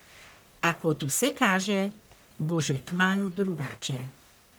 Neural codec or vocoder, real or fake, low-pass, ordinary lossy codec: codec, 44.1 kHz, 1.7 kbps, Pupu-Codec; fake; none; none